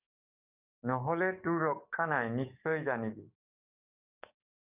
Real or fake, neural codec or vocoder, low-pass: fake; codec, 44.1 kHz, 7.8 kbps, DAC; 3.6 kHz